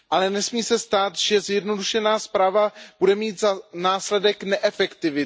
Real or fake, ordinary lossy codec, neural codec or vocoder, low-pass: real; none; none; none